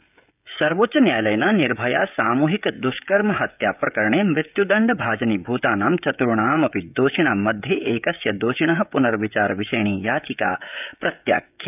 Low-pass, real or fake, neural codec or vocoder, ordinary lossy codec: 3.6 kHz; fake; codec, 16 kHz, 16 kbps, FreqCodec, smaller model; none